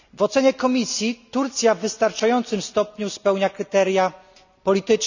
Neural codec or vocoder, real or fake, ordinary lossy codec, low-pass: none; real; none; 7.2 kHz